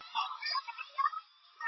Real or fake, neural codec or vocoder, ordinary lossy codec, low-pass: real; none; MP3, 24 kbps; 7.2 kHz